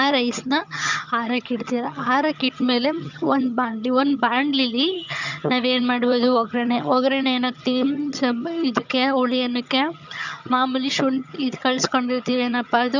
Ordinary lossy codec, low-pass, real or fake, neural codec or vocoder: none; 7.2 kHz; fake; vocoder, 22.05 kHz, 80 mel bands, HiFi-GAN